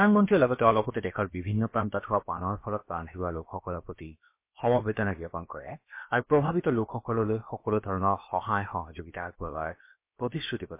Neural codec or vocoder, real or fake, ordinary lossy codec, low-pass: codec, 16 kHz, about 1 kbps, DyCAST, with the encoder's durations; fake; MP3, 24 kbps; 3.6 kHz